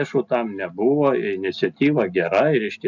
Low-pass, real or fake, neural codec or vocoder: 7.2 kHz; real; none